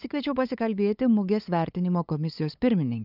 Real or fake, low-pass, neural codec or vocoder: fake; 5.4 kHz; codec, 16 kHz, 8 kbps, FunCodec, trained on LibriTTS, 25 frames a second